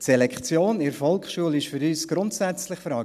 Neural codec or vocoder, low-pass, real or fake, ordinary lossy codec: none; 14.4 kHz; real; none